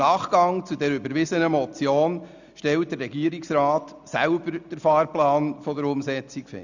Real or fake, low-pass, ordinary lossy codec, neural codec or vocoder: real; 7.2 kHz; none; none